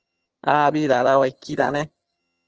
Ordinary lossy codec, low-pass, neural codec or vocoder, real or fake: Opus, 16 kbps; 7.2 kHz; vocoder, 22.05 kHz, 80 mel bands, HiFi-GAN; fake